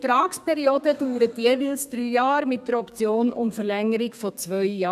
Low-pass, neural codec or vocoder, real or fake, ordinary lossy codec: 14.4 kHz; codec, 32 kHz, 1.9 kbps, SNAC; fake; none